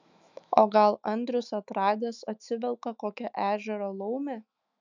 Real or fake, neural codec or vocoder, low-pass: fake; autoencoder, 48 kHz, 128 numbers a frame, DAC-VAE, trained on Japanese speech; 7.2 kHz